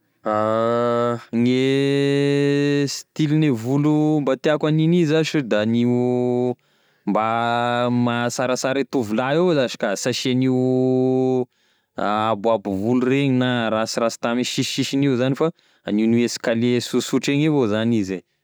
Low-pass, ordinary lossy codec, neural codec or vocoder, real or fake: none; none; none; real